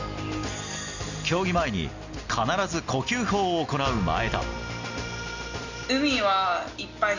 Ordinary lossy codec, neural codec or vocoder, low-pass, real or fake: none; none; 7.2 kHz; real